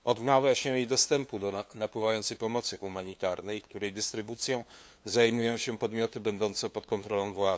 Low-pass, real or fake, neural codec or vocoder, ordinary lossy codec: none; fake; codec, 16 kHz, 2 kbps, FunCodec, trained on LibriTTS, 25 frames a second; none